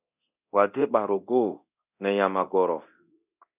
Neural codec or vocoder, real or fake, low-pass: codec, 24 kHz, 0.9 kbps, DualCodec; fake; 3.6 kHz